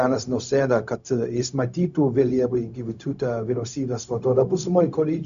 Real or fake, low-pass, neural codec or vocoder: fake; 7.2 kHz; codec, 16 kHz, 0.4 kbps, LongCat-Audio-Codec